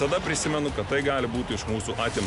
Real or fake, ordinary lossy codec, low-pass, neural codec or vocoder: real; MP3, 96 kbps; 10.8 kHz; none